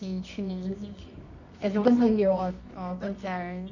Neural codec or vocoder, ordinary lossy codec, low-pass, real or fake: codec, 24 kHz, 0.9 kbps, WavTokenizer, medium music audio release; none; 7.2 kHz; fake